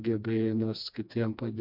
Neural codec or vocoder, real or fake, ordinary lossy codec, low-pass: codec, 16 kHz, 2 kbps, FreqCodec, smaller model; fake; MP3, 48 kbps; 5.4 kHz